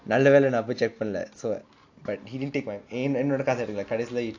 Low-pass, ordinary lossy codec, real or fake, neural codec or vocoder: 7.2 kHz; AAC, 48 kbps; real; none